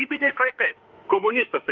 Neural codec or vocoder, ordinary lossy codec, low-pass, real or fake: autoencoder, 48 kHz, 32 numbers a frame, DAC-VAE, trained on Japanese speech; Opus, 24 kbps; 7.2 kHz; fake